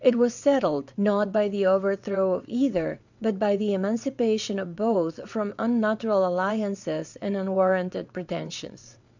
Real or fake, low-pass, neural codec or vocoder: fake; 7.2 kHz; vocoder, 22.05 kHz, 80 mel bands, WaveNeXt